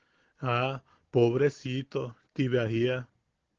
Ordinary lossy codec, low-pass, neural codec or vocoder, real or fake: Opus, 16 kbps; 7.2 kHz; none; real